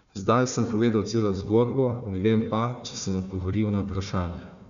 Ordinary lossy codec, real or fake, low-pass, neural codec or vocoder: none; fake; 7.2 kHz; codec, 16 kHz, 1 kbps, FunCodec, trained on Chinese and English, 50 frames a second